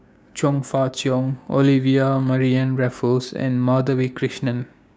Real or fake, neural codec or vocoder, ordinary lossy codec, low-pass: fake; codec, 16 kHz, 6 kbps, DAC; none; none